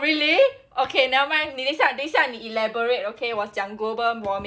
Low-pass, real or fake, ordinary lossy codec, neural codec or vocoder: none; real; none; none